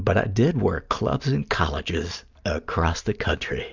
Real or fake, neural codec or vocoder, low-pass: real; none; 7.2 kHz